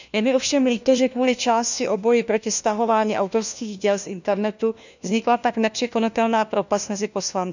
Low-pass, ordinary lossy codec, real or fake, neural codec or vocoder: 7.2 kHz; none; fake; codec, 16 kHz, 1 kbps, FunCodec, trained on LibriTTS, 50 frames a second